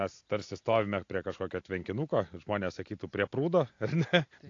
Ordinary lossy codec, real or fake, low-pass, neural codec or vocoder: AAC, 48 kbps; real; 7.2 kHz; none